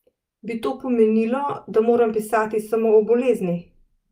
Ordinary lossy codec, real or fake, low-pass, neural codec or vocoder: Opus, 32 kbps; real; 14.4 kHz; none